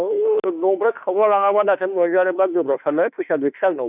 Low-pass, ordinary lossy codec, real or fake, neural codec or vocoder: 3.6 kHz; none; fake; autoencoder, 48 kHz, 32 numbers a frame, DAC-VAE, trained on Japanese speech